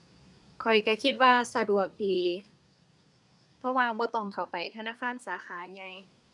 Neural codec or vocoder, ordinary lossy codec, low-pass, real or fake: codec, 24 kHz, 1 kbps, SNAC; none; 10.8 kHz; fake